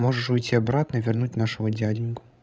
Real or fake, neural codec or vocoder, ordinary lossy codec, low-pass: fake; codec, 16 kHz, 16 kbps, FreqCodec, larger model; none; none